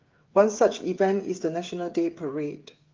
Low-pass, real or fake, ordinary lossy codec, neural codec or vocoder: 7.2 kHz; fake; Opus, 24 kbps; codec, 16 kHz, 8 kbps, FreqCodec, smaller model